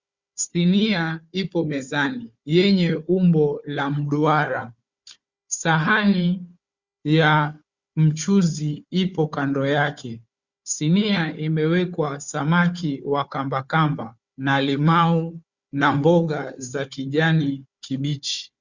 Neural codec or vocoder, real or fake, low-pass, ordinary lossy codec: codec, 16 kHz, 4 kbps, FunCodec, trained on Chinese and English, 50 frames a second; fake; 7.2 kHz; Opus, 64 kbps